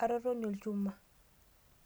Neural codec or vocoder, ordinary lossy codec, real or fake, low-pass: none; none; real; none